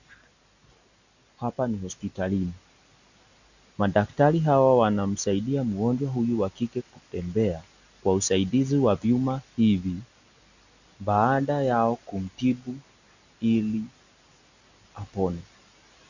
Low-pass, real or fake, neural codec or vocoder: 7.2 kHz; real; none